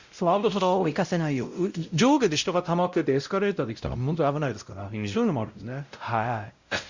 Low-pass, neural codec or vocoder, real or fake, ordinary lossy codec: 7.2 kHz; codec, 16 kHz, 0.5 kbps, X-Codec, WavLM features, trained on Multilingual LibriSpeech; fake; Opus, 64 kbps